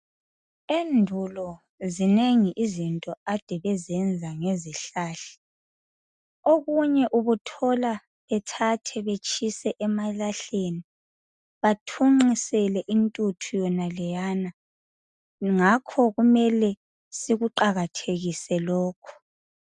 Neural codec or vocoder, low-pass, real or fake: none; 10.8 kHz; real